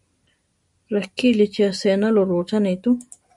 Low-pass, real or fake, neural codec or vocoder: 10.8 kHz; real; none